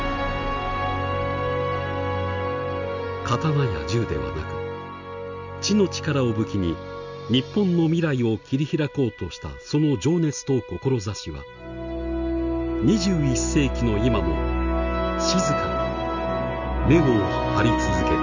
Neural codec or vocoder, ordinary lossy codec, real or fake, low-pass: none; none; real; 7.2 kHz